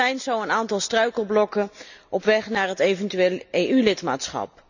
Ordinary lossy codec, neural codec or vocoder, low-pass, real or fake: none; none; 7.2 kHz; real